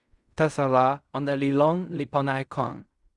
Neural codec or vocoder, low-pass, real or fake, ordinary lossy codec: codec, 16 kHz in and 24 kHz out, 0.4 kbps, LongCat-Audio-Codec, fine tuned four codebook decoder; 10.8 kHz; fake; Opus, 64 kbps